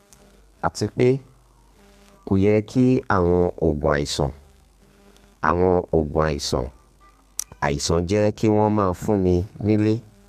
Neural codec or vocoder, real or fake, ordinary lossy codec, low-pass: codec, 32 kHz, 1.9 kbps, SNAC; fake; MP3, 96 kbps; 14.4 kHz